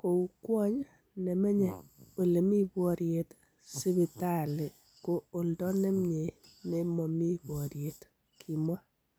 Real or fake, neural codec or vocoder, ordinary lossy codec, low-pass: real; none; none; none